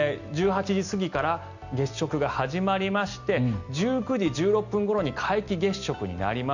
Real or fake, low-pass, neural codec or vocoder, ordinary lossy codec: real; 7.2 kHz; none; none